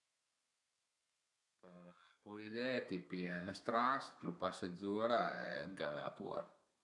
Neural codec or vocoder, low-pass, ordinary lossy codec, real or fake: codec, 32 kHz, 1.9 kbps, SNAC; 10.8 kHz; none; fake